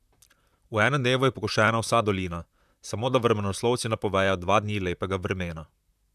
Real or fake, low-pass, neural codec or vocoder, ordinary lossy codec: fake; 14.4 kHz; vocoder, 44.1 kHz, 128 mel bands, Pupu-Vocoder; none